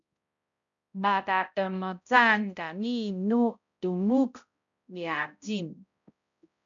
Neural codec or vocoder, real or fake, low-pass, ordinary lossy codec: codec, 16 kHz, 0.5 kbps, X-Codec, HuBERT features, trained on balanced general audio; fake; 7.2 kHz; MP3, 64 kbps